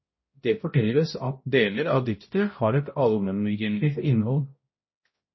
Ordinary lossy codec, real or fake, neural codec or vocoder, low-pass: MP3, 24 kbps; fake; codec, 16 kHz, 0.5 kbps, X-Codec, HuBERT features, trained on balanced general audio; 7.2 kHz